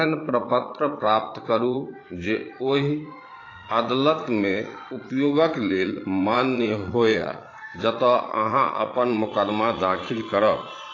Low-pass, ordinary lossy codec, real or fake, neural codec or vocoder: 7.2 kHz; AAC, 32 kbps; fake; vocoder, 22.05 kHz, 80 mel bands, Vocos